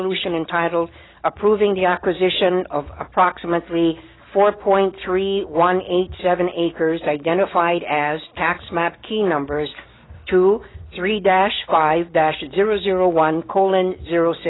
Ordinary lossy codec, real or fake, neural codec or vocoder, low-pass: AAC, 16 kbps; real; none; 7.2 kHz